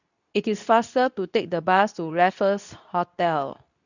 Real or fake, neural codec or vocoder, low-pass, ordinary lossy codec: fake; codec, 24 kHz, 0.9 kbps, WavTokenizer, medium speech release version 2; 7.2 kHz; none